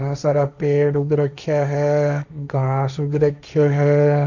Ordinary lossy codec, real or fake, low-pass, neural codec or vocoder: none; fake; 7.2 kHz; codec, 16 kHz, 1.1 kbps, Voila-Tokenizer